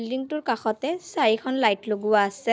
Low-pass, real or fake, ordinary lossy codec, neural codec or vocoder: none; real; none; none